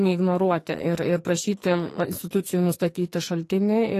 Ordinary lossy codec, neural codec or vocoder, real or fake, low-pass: AAC, 48 kbps; codec, 44.1 kHz, 2.6 kbps, SNAC; fake; 14.4 kHz